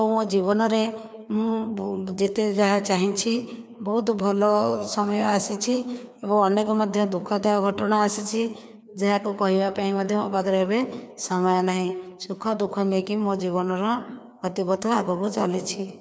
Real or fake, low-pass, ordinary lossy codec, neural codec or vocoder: fake; none; none; codec, 16 kHz, 2 kbps, FreqCodec, larger model